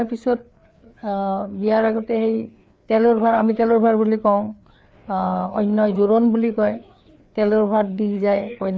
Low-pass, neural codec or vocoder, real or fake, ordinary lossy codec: none; codec, 16 kHz, 4 kbps, FreqCodec, larger model; fake; none